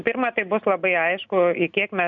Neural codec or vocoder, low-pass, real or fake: none; 7.2 kHz; real